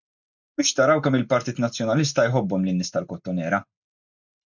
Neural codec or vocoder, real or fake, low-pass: none; real; 7.2 kHz